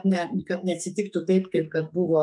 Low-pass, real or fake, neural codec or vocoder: 10.8 kHz; fake; codec, 32 kHz, 1.9 kbps, SNAC